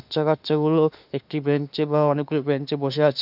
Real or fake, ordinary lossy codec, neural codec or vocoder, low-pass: fake; none; autoencoder, 48 kHz, 32 numbers a frame, DAC-VAE, trained on Japanese speech; 5.4 kHz